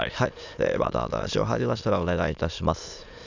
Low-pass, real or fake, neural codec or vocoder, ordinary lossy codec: 7.2 kHz; fake; autoencoder, 22.05 kHz, a latent of 192 numbers a frame, VITS, trained on many speakers; none